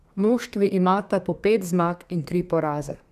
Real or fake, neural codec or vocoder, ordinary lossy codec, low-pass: fake; codec, 32 kHz, 1.9 kbps, SNAC; none; 14.4 kHz